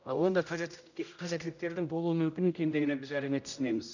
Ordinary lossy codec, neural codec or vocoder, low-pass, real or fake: none; codec, 16 kHz, 0.5 kbps, X-Codec, HuBERT features, trained on general audio; 7.2 kHz; fake